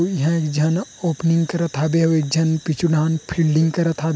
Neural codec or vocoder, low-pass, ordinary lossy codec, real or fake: none; none; none; real